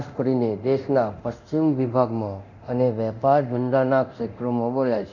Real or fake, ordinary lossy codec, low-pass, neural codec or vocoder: fake; none; 7.2 kHz; codec, 24 kHz, 0.9 kbps, DualCodec